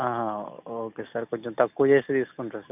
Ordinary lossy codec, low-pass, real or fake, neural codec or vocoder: none; 3.6 kHz; real; none